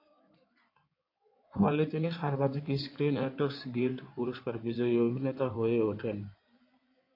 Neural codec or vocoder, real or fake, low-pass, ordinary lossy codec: codec, 16 kHz in and 24 kHz out, 2.2 kbps, FireRedTTS-2 codec; fake; 5.4 kHz; AAC, 32 kbps